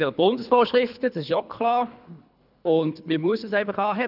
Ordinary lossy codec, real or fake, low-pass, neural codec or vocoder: none; fake; 5.4 kHz; codec, 24 kHz, 3 kbps, HILCodec